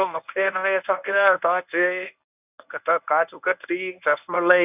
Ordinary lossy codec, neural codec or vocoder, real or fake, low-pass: none; codec, 24 kHz, 0.9 kbps, WavTokenizer, medium speech release version 1; fake; 3.6 kHz